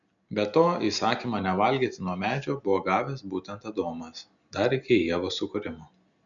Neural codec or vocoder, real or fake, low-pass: none; real; 7.2 kHz